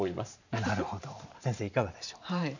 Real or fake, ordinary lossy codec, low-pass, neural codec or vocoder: fake; none; 7.2 kHz; vocoder, 44.1 kHz, 128 mel bands every 512 samples, BigVGAN v2